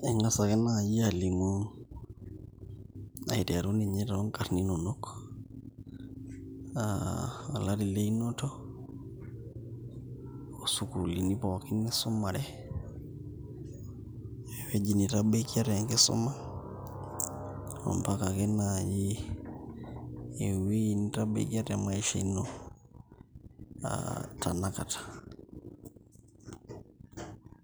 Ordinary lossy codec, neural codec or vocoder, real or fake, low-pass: none; none; real; none